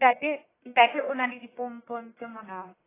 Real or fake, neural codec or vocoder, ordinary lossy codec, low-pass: fake; codec, 44.1 kHz, 1.7 kbps, Pupu-Codec; AAC, 16 kbps; 3.6 kHz